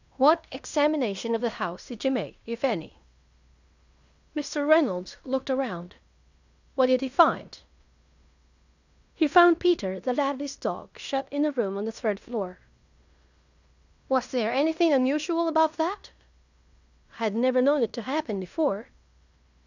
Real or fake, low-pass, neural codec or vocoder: fake; 7.2 kHz; codec, 16 kHz in and 24 kHz out, 0.9 kbps, LongCat-Audio-Codec, fine tuned four codebook decoder